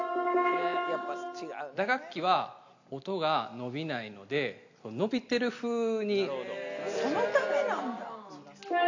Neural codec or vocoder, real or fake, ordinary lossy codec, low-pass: none; real; AAC, 48 kbps; 7.2 kHz